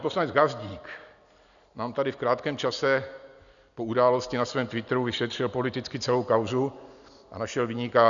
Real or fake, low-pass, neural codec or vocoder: real; 7.2 kHz; none